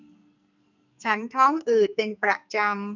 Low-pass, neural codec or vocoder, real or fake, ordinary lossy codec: 7.2 kHz; codec, 44.1 kHz, 2.6 kbps, SNAC; fake; none